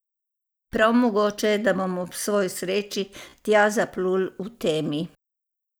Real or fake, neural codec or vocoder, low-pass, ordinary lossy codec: real; none; none; none